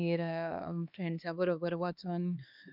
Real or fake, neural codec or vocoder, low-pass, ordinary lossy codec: fake; codec, 16 kHz, 2 kbps, X-Codec, HuBERT features, trained on LibriSpeech; 5.4 kHz; none